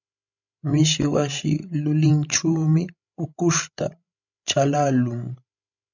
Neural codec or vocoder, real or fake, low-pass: codec, 16 kHz, 16 kbps, FreqCodec, larger model; fake; 7.2 kHz